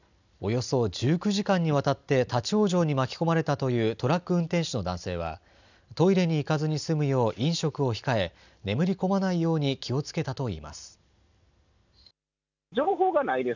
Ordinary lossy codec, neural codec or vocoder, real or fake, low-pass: none; none; real; 7.2 kHz